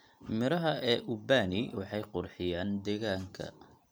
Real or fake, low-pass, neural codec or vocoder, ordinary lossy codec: real; none; none; none